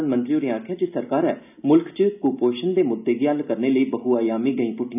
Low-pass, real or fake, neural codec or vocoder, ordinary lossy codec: 3.6 kHz; real; none; none